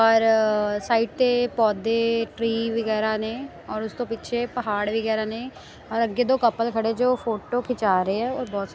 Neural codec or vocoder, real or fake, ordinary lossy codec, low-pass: none; real; none; none